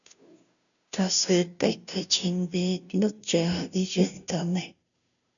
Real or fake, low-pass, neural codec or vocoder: fake; 7.2 kHz; codec, 16 kHz, 0.5 kbps, FunCodec, trained on Chinese and English, 25 frames a second